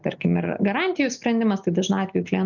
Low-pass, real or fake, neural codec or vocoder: 7.2 kHz; real; none